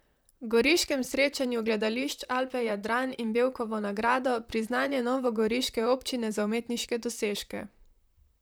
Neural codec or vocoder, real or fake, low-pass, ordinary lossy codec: vocoder, 44.1 kHz, 128 mel bands, Pupu-Vocoder; fake; none; none